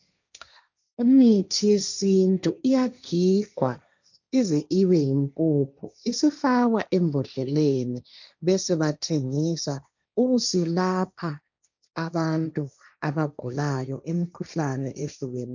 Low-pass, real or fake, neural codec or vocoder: 7.2 kHz; fake; codec, 16 kHz, 1.1 kbps, Voila-Tokenizer